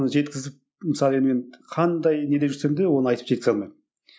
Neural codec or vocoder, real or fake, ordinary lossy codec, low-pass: none; real; none; none